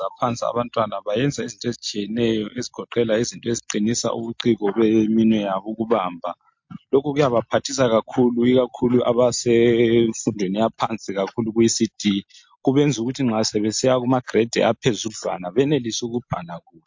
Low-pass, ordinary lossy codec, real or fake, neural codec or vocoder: 7.2 kHz; MP3, 48 kbps; real; none